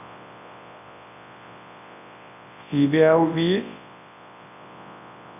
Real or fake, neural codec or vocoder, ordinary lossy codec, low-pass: fake; codec, 24 kHz, 0.9 kbps, WavTokenizer, large speech release; none; 3.6 kHz